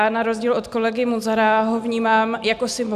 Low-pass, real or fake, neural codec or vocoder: 14.4 kHz; real; none